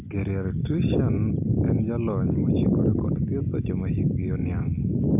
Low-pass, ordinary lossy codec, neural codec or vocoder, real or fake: 3.6 kHz; MP3, 32 kbps; none; real